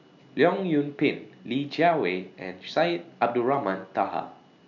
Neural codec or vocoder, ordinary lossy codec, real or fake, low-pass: none; none; real; 7.2 kHz